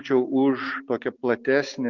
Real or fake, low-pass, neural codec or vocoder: real; 7.2 kHz; none